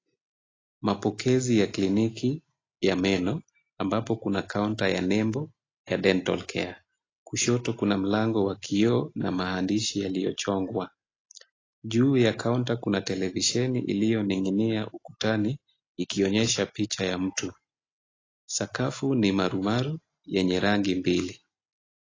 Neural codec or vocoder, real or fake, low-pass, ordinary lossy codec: none; real; 7.2 kHz; AAC, 32 kbps